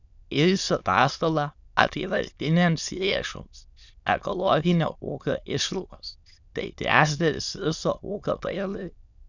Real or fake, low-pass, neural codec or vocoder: fake; 7.2 kHz; autoencoder, 22.05 kHz, a latent of 192 numbers a frame, VITS, trained on many speakers